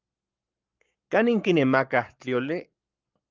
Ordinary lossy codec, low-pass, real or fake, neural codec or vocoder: Opus, 32 kbps; 7.2 kHz; fake; codec, 44.1 kHz, 7.8 kbps, Pupu-Codec